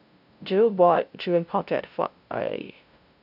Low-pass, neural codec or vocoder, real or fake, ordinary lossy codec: 5.4 kHz; codec, 16 kHz, 0.5 kbps, FunCodec, trained on LibriTTS, 25 frames a second; fake; none